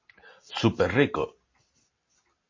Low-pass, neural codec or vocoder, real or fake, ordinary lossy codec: 7.2 kHz; none; real; MP3, 32 kbps